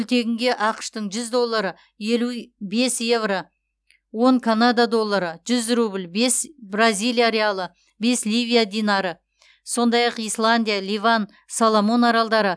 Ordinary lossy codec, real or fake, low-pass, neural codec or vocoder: none; real; none; none